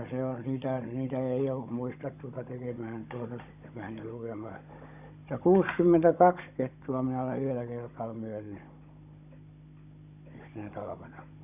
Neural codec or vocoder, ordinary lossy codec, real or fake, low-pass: codec, 16 kHz, 16 kbps, FunCodec, trained on Chinese and English, 50 frames a second; none; fake; 3.6 kHz